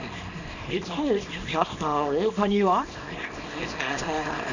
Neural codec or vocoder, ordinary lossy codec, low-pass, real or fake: codec, 24 kHz, 0.9 kbps, WavTokenizer, small release; none; 7.2 kHz; fake